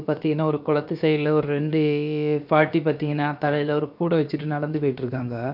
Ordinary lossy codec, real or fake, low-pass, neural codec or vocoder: none; fake; 5.4 kHz; codec, 16 kHz, about 1 kbps, DyCAST, with the encoder's durations